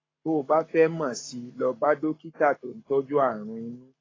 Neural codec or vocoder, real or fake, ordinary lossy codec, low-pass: codec, 44.1 kHz, 7.8 kbps, Pupu-Codec; fake; AAC, 32 kbps; 7.2 kHz